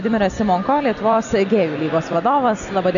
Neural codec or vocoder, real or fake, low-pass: none; real; 7.2 kHz